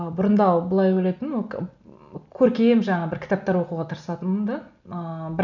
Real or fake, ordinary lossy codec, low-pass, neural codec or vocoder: real; none; 7.2 kHz; none